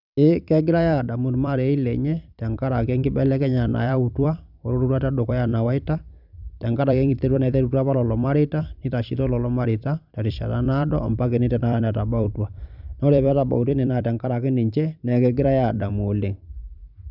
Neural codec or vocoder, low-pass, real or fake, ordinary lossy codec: vocoder, 44.1 kHz, 128 mel bands every 256 samples, BigVGAN v2; 5.4 kHz; fake; none